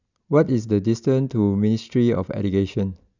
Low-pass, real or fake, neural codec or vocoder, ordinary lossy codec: 7.2 kHz; real; none; none